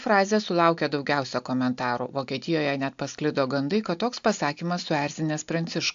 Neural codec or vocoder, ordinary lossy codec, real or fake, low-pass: none; AAC, 64 kbps; real; 7.2 kHz